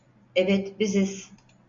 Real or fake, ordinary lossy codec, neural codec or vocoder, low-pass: real; AAC, 64 kbps; none; 7.2 kHz